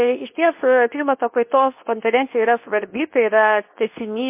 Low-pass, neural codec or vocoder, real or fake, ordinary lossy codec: 3.6 kHz; codec, 24 kHz, 0.9 kbps, WavTokenizer, medium speech release version 2; fake; MP3, 24 kbps